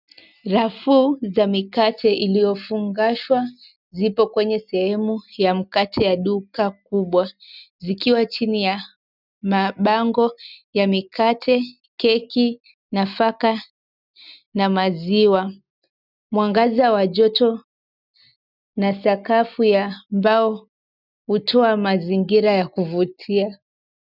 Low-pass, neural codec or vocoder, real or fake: 5.4 kHz; none; real